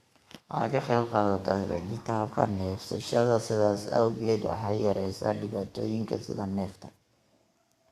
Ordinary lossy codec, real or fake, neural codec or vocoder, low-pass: Opus, 64 kbps; fake; codec, 32 kHz, 1.9 kbps, SNAC; 14.4 kHz